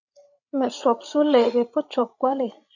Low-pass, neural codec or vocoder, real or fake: 7.2 kHz; codec, 16 kHz, 16 kbps, FreqCodec, larger model; fake